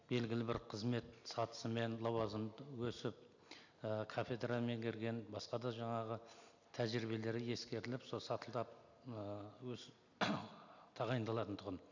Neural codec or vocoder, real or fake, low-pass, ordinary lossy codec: none; real; 7.2 kHz; none